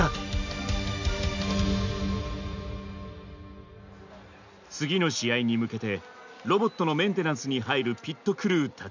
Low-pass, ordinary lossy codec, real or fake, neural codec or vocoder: 7.2 kHz; none; real; none